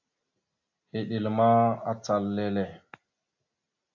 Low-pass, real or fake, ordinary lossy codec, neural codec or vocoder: 7.2 kHz; real; AAC, 48 kbps; none